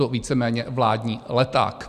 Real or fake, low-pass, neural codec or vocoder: real; 14.4 kHz; none